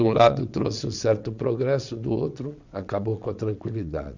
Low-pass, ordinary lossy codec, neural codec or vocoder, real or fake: 7.2 kHz; none; vocoder, 22.05 kHz, 80 mel bands, WaveNeXt; fake